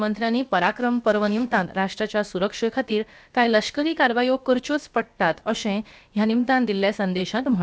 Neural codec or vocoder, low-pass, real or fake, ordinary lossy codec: codec, 16 kHz, about 1 kbps, DyCAST, with the encoder's durations; none; fake; none